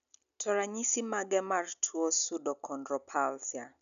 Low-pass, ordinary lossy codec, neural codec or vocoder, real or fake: 7.2 kHz; none; none; real